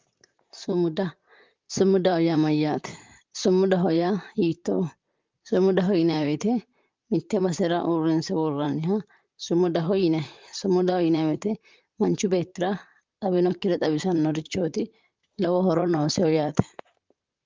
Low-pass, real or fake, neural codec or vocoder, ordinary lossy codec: 7.2 kHz; fake; vocoder, 44.1 kHz, 128 mel bands, Pupu-Vocoder; Opus, 24 kbps